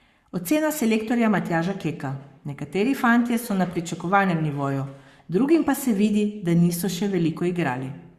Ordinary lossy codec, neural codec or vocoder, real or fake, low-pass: Opus, 64 kbps; codec, 44.1 kHz, 7.8 kbps, Pupu-Codec; fake; 14.4 kHz